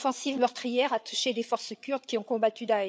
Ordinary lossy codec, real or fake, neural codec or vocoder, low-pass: none; fake; codec, 16 kHz, 8 kbps, FreqCodec, larger model; none